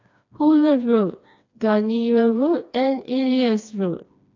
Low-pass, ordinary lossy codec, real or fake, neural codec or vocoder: 7.2 kHz; AAC, 48 kbps; fake; codec, 16 kHz, 2 kbps, FreqCodec, smaller model